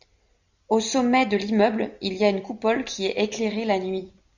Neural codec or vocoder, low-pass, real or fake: none; 7.2 kHz; real